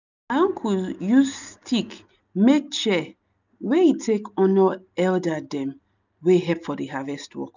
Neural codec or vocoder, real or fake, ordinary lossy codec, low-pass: none; real; none; 7.2 kHz